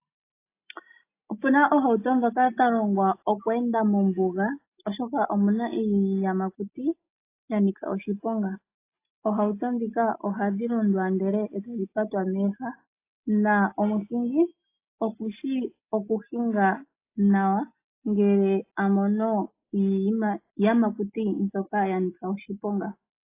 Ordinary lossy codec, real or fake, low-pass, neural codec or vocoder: AAC, 24 kbps; real; 3.6 kHz; none